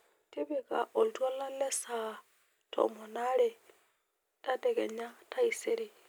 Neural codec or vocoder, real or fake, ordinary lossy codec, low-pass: none; real; none; none